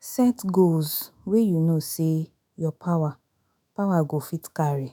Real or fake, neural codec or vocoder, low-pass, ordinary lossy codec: fake; autoencoder, 48 kHz, 128 numbers a frame, DAC-VAE, trained on Japanese speech; none; none